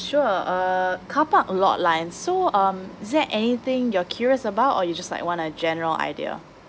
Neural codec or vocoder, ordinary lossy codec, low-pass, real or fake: none; none; none; real